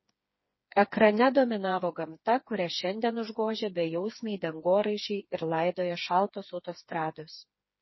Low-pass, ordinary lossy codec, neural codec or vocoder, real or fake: 7.2 kHz; MP3, 24 kbps; codec, 16 kHz, 4 kbps, FreqCodec, smaller model; fake